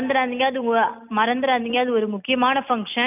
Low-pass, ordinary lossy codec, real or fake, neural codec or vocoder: 3.6 kHz; none; real; none